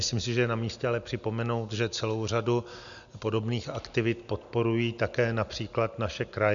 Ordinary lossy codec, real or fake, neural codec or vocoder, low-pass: MP3, 96 kbps; real; none; 7.2 kHz